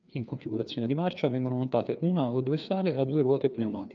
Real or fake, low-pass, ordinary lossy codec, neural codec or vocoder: fake; 7.2 kHz; Opus, 24 kbps; codec, 16 kHz, 2 kbps, FreqCodec, larger model